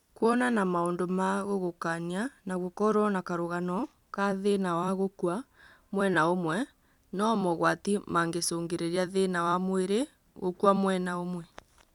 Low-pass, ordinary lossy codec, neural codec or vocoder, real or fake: 19.8 kHz; none; vocoder, 44.1 kHz, 128 mel bands every 256 samples, BigVGAN v2; fake